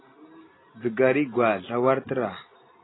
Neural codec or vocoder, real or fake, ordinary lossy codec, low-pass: none; real; AAC, 16 kbps; 7.2 kHz